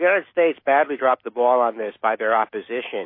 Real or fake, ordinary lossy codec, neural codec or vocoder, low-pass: fake; MP3, 24 kbps; autoencoder, 48 kHz, 128 numbers a frame, DAC-VAE, trained on Japanese speech; 5.4 kHz